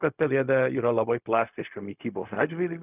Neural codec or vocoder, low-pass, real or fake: codec, 16 kHz, 0.4 kbps, LongCat-Audio-Codec; 3.6 kHz; fake